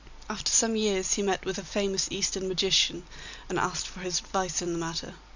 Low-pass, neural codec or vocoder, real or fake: 7.2 kHz; none; real